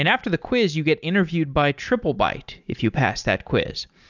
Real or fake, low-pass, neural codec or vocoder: real; 7.2 kHz; none